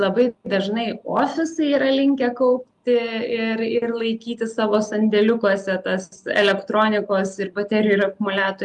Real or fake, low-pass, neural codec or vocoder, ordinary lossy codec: real; 10.8 kHz; none; Opus, 32 kbps